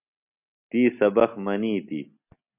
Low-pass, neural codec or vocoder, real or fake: 3.6 kHz; none; real